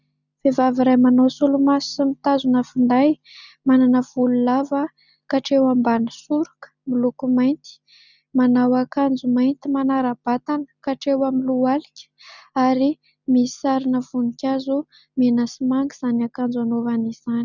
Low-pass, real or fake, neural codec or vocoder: 7.2 kHz; real; none